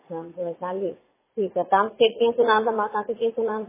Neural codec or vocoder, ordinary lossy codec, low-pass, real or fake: vocoder, 44.1 kHz, 80 mel bands, Vocos; MP3, 16 kbps; 3.6 kHz; fake